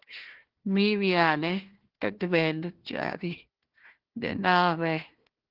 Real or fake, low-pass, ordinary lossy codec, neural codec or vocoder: fake; 5.4 kHz; Opus, 16 kbps; codec, 16 kHz, 1 kbps, FunCodec, trained on Chinese and English, 50 frames a second